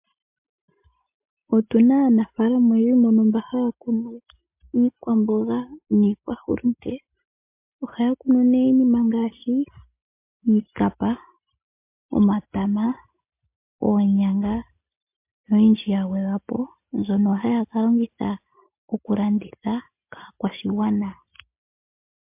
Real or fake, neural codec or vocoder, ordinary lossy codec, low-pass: real; none; MP3, 32 kbps; 3.6 kHz